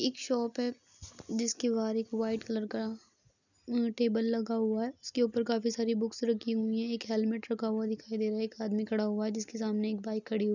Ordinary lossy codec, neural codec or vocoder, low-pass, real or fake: none; none; 7.2 kHz; real